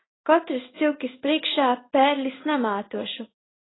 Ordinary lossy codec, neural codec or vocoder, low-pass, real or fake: AAC, 16 kbps; codec, 16 kHz in and 24 kHz out, 1 kbps, XY-Tokenizer; 7.2 kHz; fake